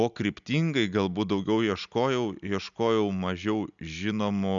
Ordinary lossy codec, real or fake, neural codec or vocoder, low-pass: MP3, 96 kbps; real; none; 7.2 kHz